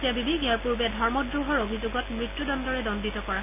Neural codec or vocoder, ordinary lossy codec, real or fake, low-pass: none; none; real; 3.6 kHz